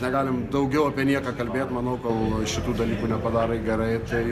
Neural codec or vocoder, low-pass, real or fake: none; 14.4 kHz; real